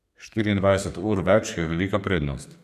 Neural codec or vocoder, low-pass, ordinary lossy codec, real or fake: codec, 32 kHz, 1.9 kbps, SNAC; 14.4 kHz; none; fake